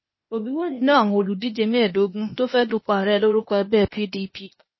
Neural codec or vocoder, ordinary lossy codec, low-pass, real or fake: codec, 16 kHz, 0.8 kbps, ZipCodec; MP3, 24 kbps; 7.2 kHz; fake